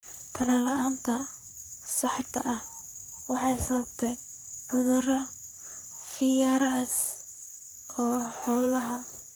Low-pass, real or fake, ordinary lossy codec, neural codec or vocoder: none; fake; none; codec, 44.1 kHz, 3.4 kbps, Pupu-Codec